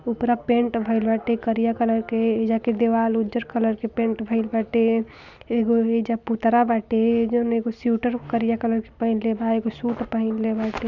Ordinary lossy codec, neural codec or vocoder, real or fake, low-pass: none; none; real; 7.2 kHz